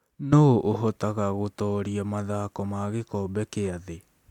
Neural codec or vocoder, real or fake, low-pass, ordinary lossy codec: none; real; 19.8 kHz; MP3, 96 kbps